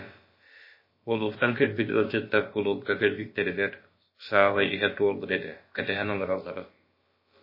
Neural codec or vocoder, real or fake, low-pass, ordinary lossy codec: codec, 16 kHz, about 1 kbps, DyCAST, with the encoder's durations; fake; 5.4 kHz; MP3, 24 kbps